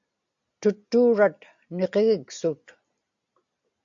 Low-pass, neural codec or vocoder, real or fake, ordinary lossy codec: 7.2 kHz; none; real; AAC, 64 kbps